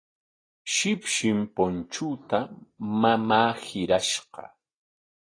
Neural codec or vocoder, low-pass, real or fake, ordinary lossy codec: none; 9.9 kHz; real; AAC, 32 kbps